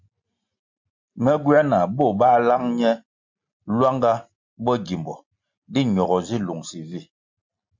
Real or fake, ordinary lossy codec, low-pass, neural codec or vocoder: real; MP3, 64 kbps; 7.2 kHz; none